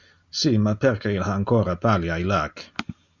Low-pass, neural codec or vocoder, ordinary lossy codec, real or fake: 7.2 kHz; none; Opus, 64 kbps; real